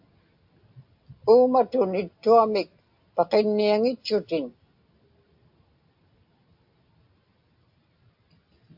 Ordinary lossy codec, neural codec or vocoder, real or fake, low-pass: AAC, 48 kbps; none; real; 5.4 kHz